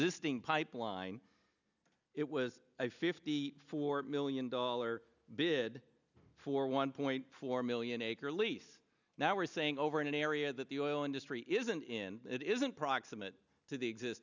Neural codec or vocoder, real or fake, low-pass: none; real; 7.2 kHz